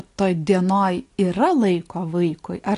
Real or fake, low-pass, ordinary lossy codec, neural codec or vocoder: real; 10.8 kHz; AAC, 48 kbps; none